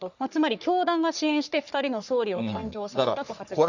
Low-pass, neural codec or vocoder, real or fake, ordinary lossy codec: 7.2 kHz; codec, 44.1 kHz, 3.4 kbps, Pupu-Codec; fake; none